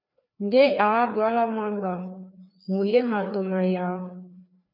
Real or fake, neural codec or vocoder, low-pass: fake; codec, 16 kHz, 2 kbps, FreqCodec, larger model; 5.4 kHz